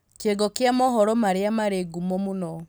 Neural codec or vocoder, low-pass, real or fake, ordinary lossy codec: none; none; real; none